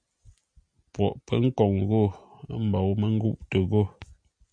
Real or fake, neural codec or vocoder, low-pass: real; none; 9.9 kHz